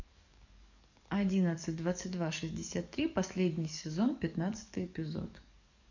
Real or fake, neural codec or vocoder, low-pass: fake; codec, 44.1 kHz, 7.8 kbps, DAC; 7.2 kHz